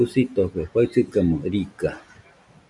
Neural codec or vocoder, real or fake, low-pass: none; real; 10.8 kHz